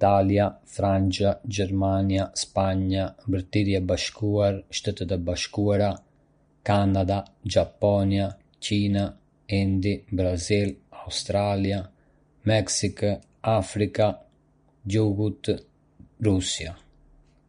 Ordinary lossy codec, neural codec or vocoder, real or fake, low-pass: MP3, 48 kbps; none; real; 9.9 kHz